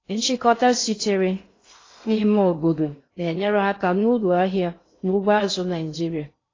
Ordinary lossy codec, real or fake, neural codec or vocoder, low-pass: AAC, 32 kbps; fake; codec, 16 kHz in and 24 kHz out, 0.6 kbps, FocalCodec, streaming, 2048 codes; 7.2 kHz